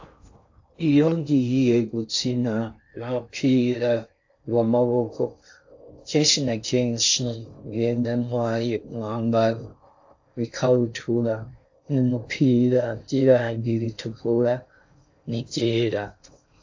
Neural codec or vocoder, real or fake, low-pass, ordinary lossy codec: codec, 16 kHz in and 24 kHz out, 0.6 kbps, FocalCodec, streaming, 2048 codes; fake; 7.2 kHz; AAC, 48 kbps